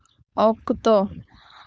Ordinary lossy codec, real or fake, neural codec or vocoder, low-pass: none; fake; codec, 16 kHz, 4.8 kbps, FACodec; none